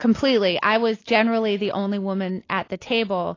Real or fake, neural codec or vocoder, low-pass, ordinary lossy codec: real; none; 7.2 kHz; AAC, 32 kbps